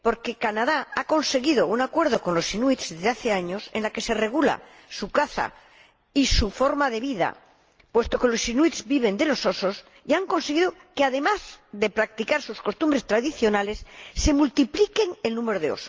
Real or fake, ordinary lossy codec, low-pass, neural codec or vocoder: real; Opus, 24 kbps; 7.2 kHz; none